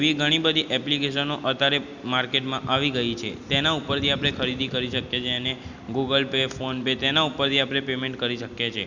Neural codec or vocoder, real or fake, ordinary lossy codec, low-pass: none; real; none; 7.2 kHz